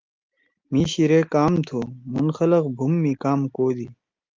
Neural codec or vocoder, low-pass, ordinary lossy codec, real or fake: none; 7.2 kHz; Opus, 32 kbps; real